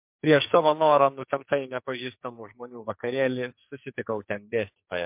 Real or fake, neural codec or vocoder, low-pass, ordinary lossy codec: fake; codec, 44.1 kHz, 2.6 kbps, SNAC; 3.6 kHz; MP3, 32 kbps